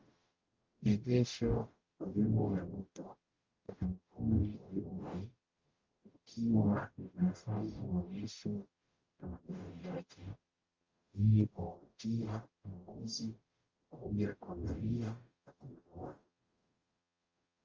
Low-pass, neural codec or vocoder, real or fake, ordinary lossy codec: 7.2 kHz; codec, 44.1 kHz, 0.9 kbps, DAC; fake; Opus, 32 kbps